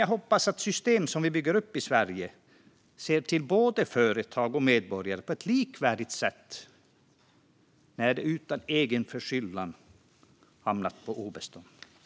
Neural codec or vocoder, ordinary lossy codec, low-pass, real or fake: none; none; none; real